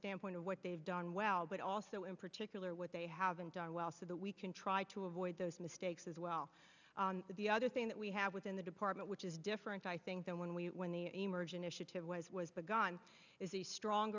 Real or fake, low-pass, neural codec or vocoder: real; 7.2 kHz; none